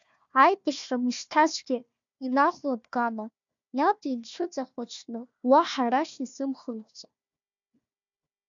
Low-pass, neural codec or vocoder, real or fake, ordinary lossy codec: 7.2 kHz; codec, 16 kHz, 1 kbps, FunCodec, trained on Chinese and English, 50 frames a second; fake; MP3, 64 kbps